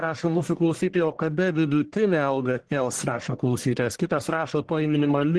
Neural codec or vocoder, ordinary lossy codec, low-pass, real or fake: codec, 44.1 kHz, 1.7 kbps, Pupu-Codec; Opus, 16 kbps; 10.8 kHz; fake